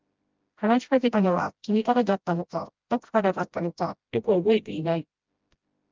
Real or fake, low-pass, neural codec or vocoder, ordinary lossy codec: fake; 7.2 kHz; codec, 16 kHz, 0.5 kbps, FreqCodec, smaller model; Opus, 24 kbps